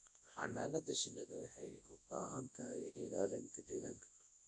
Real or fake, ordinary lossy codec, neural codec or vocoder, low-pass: fake; AAC, 48 kbps; codec, 24 kHz, 0.9 kbps, WavTokenizer, large speech release; 10.8 kHz